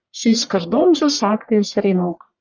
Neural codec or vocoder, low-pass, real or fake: codec, 44.1 kHz, 1.7 kbps, Pupu-Codec; 7.2 kHz; fake